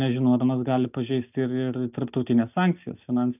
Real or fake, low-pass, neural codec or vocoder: real; 3.6 kHz; none